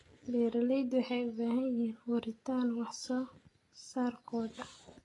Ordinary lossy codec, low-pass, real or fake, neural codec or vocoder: AAC, 32 kbps; 10.8 kHz; real; none